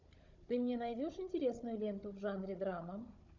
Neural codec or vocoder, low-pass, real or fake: codec, 16 kHz, 16 kbps, FunCodec, trained on Chinese and English, 50 frames a second; 7.2 kHz; fake